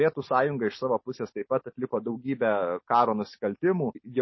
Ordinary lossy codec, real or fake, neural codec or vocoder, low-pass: MP3, 24 kbps; real; none; 7.2 kHz